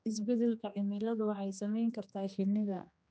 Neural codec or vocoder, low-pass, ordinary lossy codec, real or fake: codec, 16 kHz, 2 kbps, X-Codec, HuBERT features, trained on general audio; none; none; fake